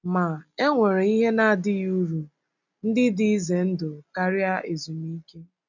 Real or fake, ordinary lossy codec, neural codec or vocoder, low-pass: real; none; none; 7.2 kHz